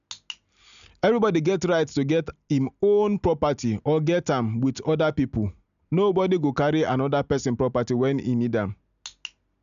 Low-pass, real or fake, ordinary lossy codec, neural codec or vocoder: 7.2 kHz; real; none; none